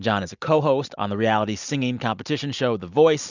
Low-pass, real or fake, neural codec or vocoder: 7.2 kHz; real; none